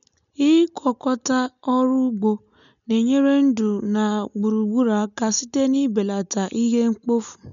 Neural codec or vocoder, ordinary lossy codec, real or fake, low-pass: none; none; real; 7.2 kHz